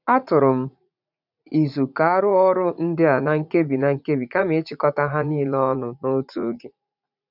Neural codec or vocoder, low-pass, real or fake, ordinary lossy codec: vocoder, 44.1 kHz, 80 mel bands, Vocos; 5.4 kHz; fake; none